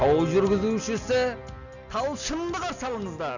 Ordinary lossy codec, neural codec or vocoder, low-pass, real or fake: none; none; 7.2 kHz; real